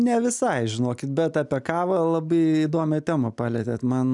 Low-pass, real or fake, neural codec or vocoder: 10.8 kHz; real; none